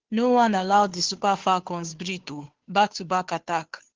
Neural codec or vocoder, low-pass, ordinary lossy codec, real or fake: codec, 16 kHz, 2 kbps, FunCodec, trained on Chinese and English, 25 frames a second; 7.2 kHz; Opus, 16 kbps; fake